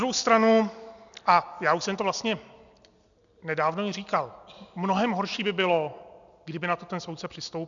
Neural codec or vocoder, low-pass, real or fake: none; 7.2 kHz; real